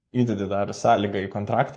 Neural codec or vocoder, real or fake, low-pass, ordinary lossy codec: codec, 44.1 kHz, 7.8 kbps, Pupu-Codec; fake; 9.9 kHz; MP3, 48 kbps